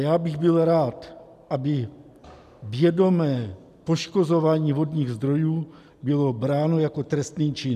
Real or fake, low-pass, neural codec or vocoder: real; 14.4 kHz; none